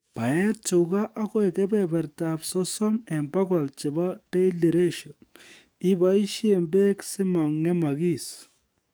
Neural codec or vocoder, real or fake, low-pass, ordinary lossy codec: codec, 44.1 kHz, 7.8 kbps, DAC; fake; none; none